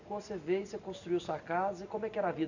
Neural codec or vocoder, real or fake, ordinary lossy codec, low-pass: none; real; none; 7.2 kHz